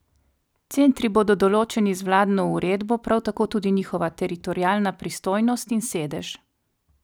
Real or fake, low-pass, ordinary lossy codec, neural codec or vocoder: real; none; none; none